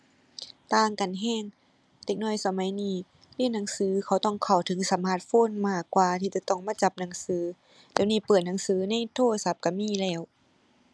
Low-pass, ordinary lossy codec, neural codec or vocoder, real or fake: none; none; none; real